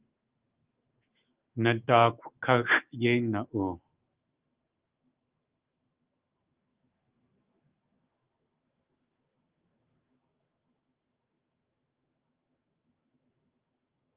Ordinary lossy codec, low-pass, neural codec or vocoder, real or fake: Opus, 24 kbps; 3.6 kHz; autoencoder, 48 kHz, 128 numbers a frame, DAC-VAE, trained on Japanese speech; fake